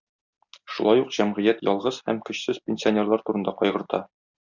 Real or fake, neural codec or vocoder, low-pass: real; none; 7.2 kHz